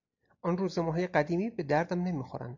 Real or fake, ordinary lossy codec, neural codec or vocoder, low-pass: real; AAC, 48 kbps; none; 7.2 kHz